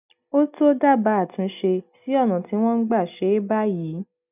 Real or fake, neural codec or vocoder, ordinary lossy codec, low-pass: real; none; none; 3.6 kHz